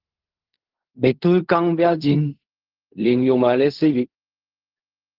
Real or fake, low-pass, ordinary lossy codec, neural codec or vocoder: fake; 5.4 kHz; Opus, 16 kbps; codec, 16 kHz in and 24 kHz out, 0.4 kbps, LongCat-Audio-Codec, fine tuned four codebook decoder